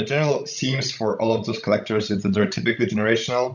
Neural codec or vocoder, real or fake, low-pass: codec, 16 kHz, 16 kbps, FreqCodec, larger model; fake; 7.2 kHz